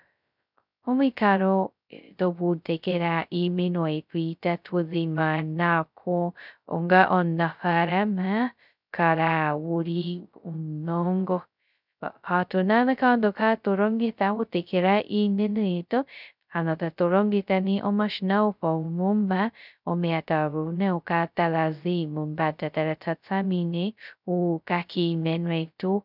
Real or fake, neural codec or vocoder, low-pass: fake; codec, 16 kHz, 0.2 kbps, FocalCodec; 5.4 kHz